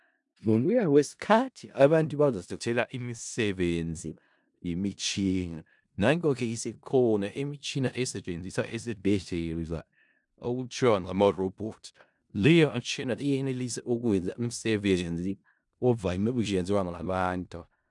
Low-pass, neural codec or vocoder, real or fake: 10.8 kHz; codec, 16 kHz in and 24 kHz out, 0.4 kbps, LongCat-Audio-Codec, four codebook decoder; fake